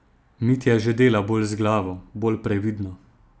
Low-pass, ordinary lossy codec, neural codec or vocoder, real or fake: none; none; none; real